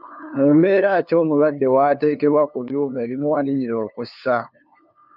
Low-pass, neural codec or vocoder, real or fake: 5.4 kHz; codec, 16 kHz, 2 kbps, FunCodec, trained on LibriTTS, 25 frames a second; fake